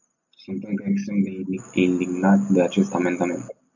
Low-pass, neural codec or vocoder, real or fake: 7.2 kHz; none; real